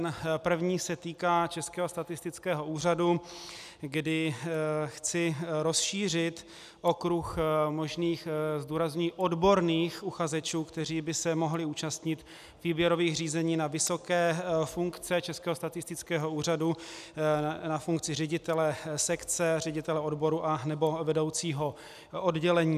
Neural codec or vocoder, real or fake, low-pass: none; real; 14.4 kHz